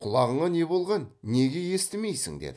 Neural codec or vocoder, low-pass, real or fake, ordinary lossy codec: none; none; real; none